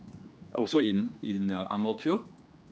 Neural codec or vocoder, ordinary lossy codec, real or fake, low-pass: codec, 16 kHz, 2 kbps, X-Codec, HuBERT features, trained on general audio; none; fake; none